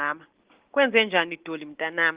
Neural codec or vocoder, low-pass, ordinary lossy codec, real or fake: none; 3.6 kHz; Opus, 16 kbps; real